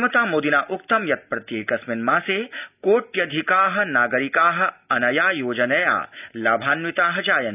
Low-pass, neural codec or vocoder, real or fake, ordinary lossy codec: 3.6 kHz; none; real; none